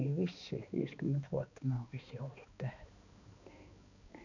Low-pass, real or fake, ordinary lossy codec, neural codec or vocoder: 7.2 kHz; fake; none; codec, 16 kHz, 2 kbps, X-Codec, HuBERT features, trained on balanced general audio